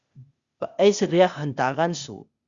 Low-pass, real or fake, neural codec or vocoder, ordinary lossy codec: 7.2 kHz; fake; codec, 16 kHz, 0.8 kbps, ZipCodec; Opus, 64 kbps